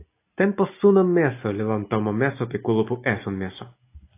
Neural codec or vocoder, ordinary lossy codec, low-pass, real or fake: none; AAC, 24 kbps; 3.6 kHz; real